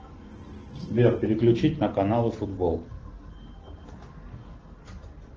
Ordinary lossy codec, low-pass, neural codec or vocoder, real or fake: Opus, 16 kbps; 7.2 kHz; none; real